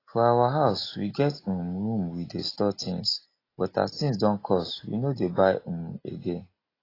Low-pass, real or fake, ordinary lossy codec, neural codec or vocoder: 5.4 kHz; real; AAC, 24 kbps; none